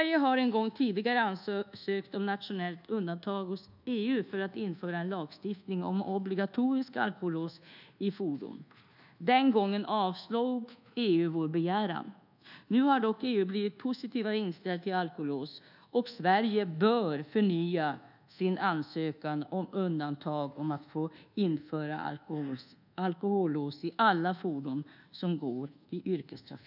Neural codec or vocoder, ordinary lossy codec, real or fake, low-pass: codec, 24 kHz, 1.2 kbps, DualCodec; none; fake; 5.4 kHz